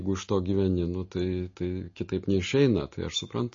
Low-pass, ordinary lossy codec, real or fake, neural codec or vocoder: 7.2 kHz; MP3, 32 kbps; real; none